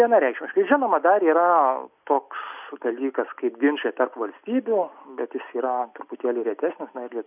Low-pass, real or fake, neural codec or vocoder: 3.6 kHz; real; none